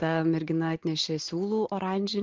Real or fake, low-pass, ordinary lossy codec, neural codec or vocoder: real; 7.2 kHz; Opus, 16 kbps; none